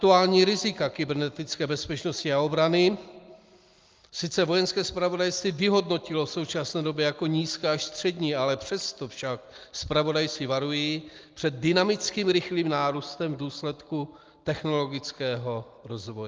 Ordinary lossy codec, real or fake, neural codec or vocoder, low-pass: Opus, 24 kbps; real; none; 7.2 kHz